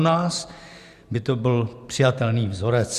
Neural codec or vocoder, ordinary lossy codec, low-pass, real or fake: vocoder, 44.1 kHz, 128 mel bands every 512 samples, BigVGAN v2; Opus, 64 kbps; 14.4 kHz; fake